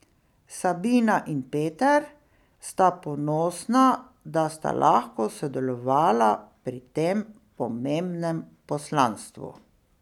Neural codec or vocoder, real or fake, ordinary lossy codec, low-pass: none; real; none; 19.8 kHz